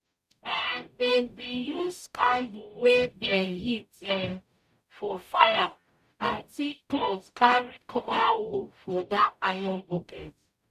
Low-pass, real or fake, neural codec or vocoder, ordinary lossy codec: 14.4 kHz; fake; codec, 44.1 kHz, 0.9 kbps, DAC; none